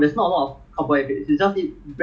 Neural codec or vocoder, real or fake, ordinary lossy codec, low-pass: none; real; none; none